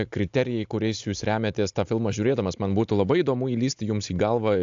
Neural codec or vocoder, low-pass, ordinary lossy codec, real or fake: none; 7.2 kHz; AAC, 64 kbps; real